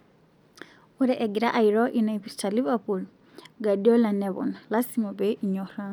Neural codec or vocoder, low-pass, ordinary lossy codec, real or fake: none; 19.8 kHz; none; real